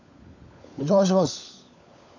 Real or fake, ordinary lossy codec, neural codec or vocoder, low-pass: fake; none; codec, 16 kHz, 16 kbps, FunCodec, trained on LibriTTS, 50 frames a second; 7.2 kHz